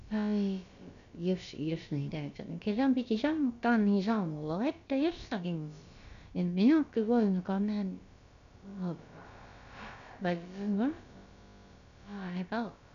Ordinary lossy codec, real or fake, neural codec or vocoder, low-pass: none; fake; codec, 16 kHz, about 1 kbps, DyCAST, with the encoder's durations; 7.2 kHz